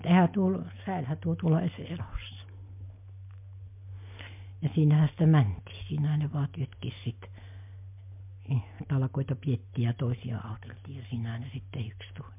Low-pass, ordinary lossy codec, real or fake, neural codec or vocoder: 3.6 kHz; MP3, 32 kbps; real; none